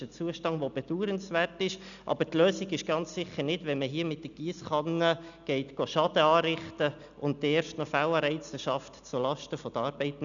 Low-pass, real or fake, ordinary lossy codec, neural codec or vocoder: 7.2 kHz; real; none; none